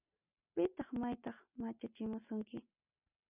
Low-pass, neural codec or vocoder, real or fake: 3.6 kHz; none; real